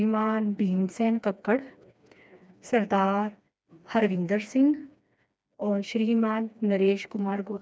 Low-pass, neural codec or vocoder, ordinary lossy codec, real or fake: none; codec, 16 kHz, 2 kbps, FreqCodec, smaller model; none; fake